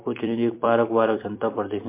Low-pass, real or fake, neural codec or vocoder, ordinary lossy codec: 3.6 kHz; fake; vocoder, 44.1 kHz, 128 mel bands every 256 samples, BigVGAN v2; MP3, 24 kbps